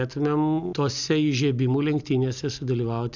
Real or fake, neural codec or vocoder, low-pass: real; none; 7.2 kHz